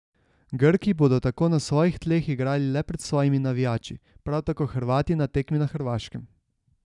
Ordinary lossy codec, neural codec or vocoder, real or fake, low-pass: none; none; real; 10.8 kHz